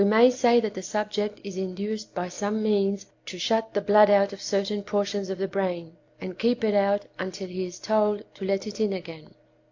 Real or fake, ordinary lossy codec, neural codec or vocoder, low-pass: real; AAC, 48 kbps; none; 7.2 kHz